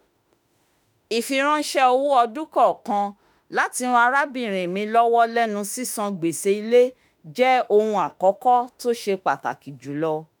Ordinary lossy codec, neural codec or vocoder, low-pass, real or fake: none; autoencoder, 48 kHz, 32 numbers a frame, DAC-VAE, trained on Japanese speech; none; fake